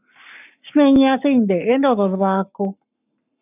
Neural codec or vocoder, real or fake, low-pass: codec, 44.1 kHz, 7.8 kbps, Pupu-Codec; fake; 3.6 kHz